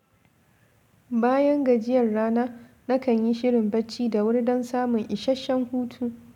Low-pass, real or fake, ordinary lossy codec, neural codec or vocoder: 19.8 kHz; real; none; none